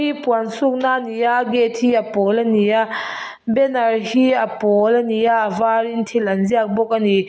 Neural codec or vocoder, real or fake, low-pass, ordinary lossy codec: none; real; none; none